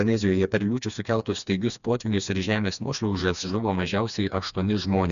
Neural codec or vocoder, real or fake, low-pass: codec, 16 kHz, 2 kbps, FreqCodec, smaller model; fake; 7.2 kHz